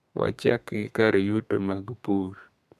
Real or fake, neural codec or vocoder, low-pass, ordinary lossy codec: fake; codec, 32 kHz, 1.9 kbps, SNAC; 14.4 kHz; none